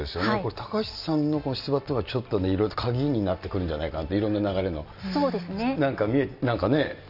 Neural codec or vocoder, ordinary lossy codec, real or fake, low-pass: none; none; real; 5.4 kHz